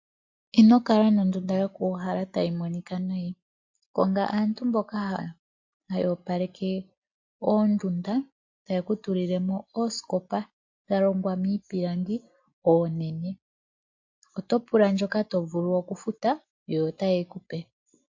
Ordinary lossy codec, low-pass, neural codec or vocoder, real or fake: MP3, 48 kbps; 7.2 kHz; none; real